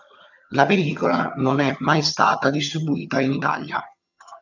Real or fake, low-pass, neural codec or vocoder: fake; 7.2 kHz; vocoder, 22.05 kHz, 80 mel bands, HiFi-GAN